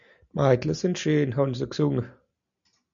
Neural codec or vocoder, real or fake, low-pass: none; real; 7.2 kHz